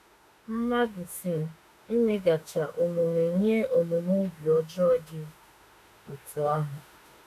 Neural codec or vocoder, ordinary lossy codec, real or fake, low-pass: autoencoder, 48 kHz, 32 numbers a frame, DAC-VAE, trained on Japanese speech; none; fake; 14.4 kHz